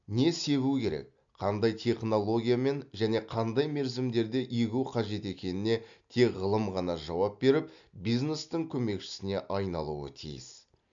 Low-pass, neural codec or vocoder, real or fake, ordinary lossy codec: 7.2 kHz; none; real; MP3, 64 kbps